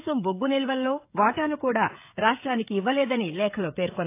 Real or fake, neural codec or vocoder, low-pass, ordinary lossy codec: fake; codec, 16 kHz, 16 kbps, FreqCodec, smaller model; 3.6 kHz; AAC, 24 kbps